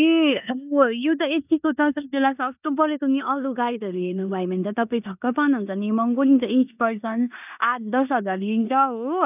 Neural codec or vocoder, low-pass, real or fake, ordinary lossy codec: codec, 16 kHz in and 24 kHz out, 0.9 kbps, LongCat-Audio-Codec, four codebook decoder; 3.6 kHz; fake; none